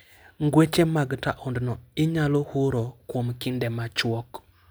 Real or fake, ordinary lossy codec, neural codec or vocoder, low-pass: real; none; none; none